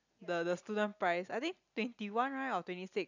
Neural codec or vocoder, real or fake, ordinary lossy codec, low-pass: none; real; none; 7.2 kHz